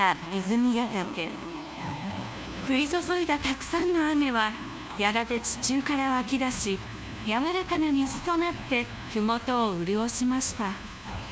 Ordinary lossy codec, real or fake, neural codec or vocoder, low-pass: none; fake; codec, 16 kHz, 1 kbps, FunCodec, trained on LibriTTS, 50 frames a second; none